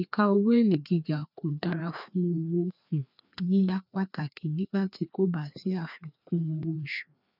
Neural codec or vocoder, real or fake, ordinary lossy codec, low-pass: codec, 16 kHz, 2 kbps, FreqCodec, larger model; fake; none; 5.4 kHz